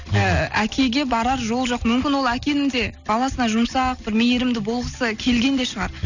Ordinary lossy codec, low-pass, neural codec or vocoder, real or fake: none; 7.2 kHz; none; real